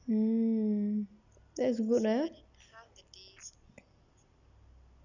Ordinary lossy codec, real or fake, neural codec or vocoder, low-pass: none; real; none; 7.2 kHz